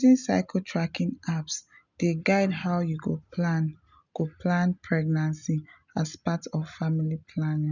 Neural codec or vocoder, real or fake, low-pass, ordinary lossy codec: none; real; 7.2 kHz; none